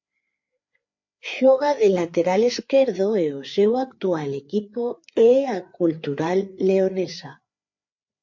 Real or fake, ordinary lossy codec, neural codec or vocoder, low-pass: fake; MP3, 48 kbps; codec, 16 kHz, 4 kbps, FreqCodec, larger model; 7.2 kHz